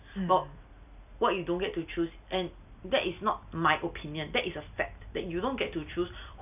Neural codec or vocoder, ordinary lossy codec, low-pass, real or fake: none; none; 3.6 kHz; real